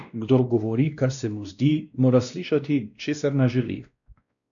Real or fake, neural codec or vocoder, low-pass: fake; codec, 16 kHz, 1 kbps, X-Codec, WavLM features, trained on Multilingual LibriSpeech; 7.2 kHz